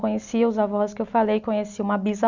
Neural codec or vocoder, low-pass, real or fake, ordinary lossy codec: none; 7.2 kHz; real; none